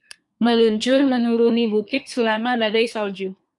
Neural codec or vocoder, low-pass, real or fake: codec, 24 kHz, 1 kbps, SNAC; 10.8 kHz; fake